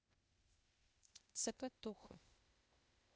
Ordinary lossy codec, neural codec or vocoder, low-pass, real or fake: none; codec, 16 kHz, 0.8 kbps, ZipCodec; none; fake